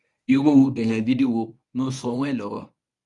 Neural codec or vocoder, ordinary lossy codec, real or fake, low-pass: codec, 24 kHz, 0.9 kbps, WavTokenizer, medium speech release version 1; none; fake; 10.8 kHz